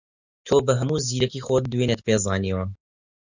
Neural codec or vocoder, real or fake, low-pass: none; real; 7.2 kHz